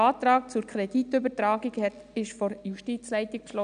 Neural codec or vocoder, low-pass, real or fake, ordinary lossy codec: none; 9.9 kHz; real; none